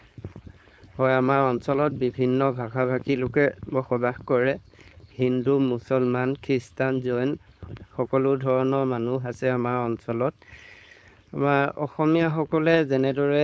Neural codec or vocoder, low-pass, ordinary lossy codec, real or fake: codec, 16 kHz, 4.8 kbps, FACodec; none; none; fake